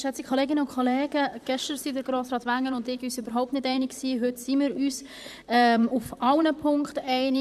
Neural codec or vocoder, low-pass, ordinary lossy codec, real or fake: vocoder, 44.1 kHz, 128 mel bands, Pupu-Vocoder; 14.4 kHz; none; fake